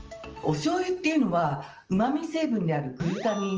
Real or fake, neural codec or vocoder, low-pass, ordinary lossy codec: real; none; 7.2 kHz; Opus, 24 kbps